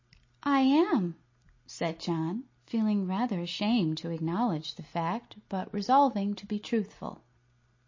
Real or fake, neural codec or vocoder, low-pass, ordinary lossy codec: real; none; 7.2 kHz; MP3, 32 kbps